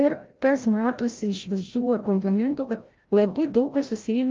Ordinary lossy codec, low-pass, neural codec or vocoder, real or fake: Opus, 32 kbps; 7.2 kHz; codec, 16 kHz, 0.5 kbps, FreqCodec, larger model; fake